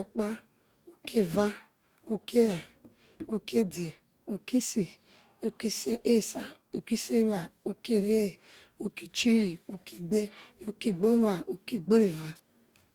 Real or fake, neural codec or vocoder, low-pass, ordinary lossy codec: fake; codec, 44.1 kHz, 2.6 kbps, DAC; 19.8 kHz; Opus, 64 kbps